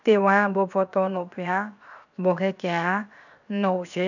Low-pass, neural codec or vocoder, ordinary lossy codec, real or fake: 7.2 kHz; codec, 16 kHz, 0.7 kbps, FocalCodec; none; fake